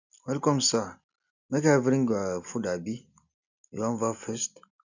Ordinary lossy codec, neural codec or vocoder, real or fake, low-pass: none; none; real; 7.2 kHz